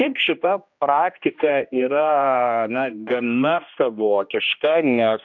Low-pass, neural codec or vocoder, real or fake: 7.2 kHz; codec, 16 kHz, 1 kbps, X-Codec, HuBERT features, trained on general audio; fake